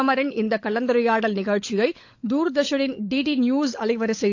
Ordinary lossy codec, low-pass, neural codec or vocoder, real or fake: AAC, 48 kbps; 7.2 kHz; codec, 16 kHz, 16 kbps, FunCodec, trained on LibriTTS, 50 frames a second; fake